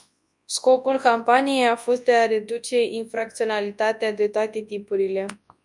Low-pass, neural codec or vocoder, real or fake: 10.8 kHz; codec, 24 kHz, 0.9 kbps, WavTokenizer, large speech release; fake